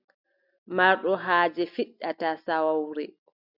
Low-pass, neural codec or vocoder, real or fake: 5.4 kHz; none; real